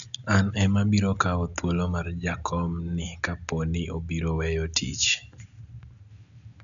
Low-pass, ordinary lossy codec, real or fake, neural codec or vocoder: 7.2 kHz; none; real; none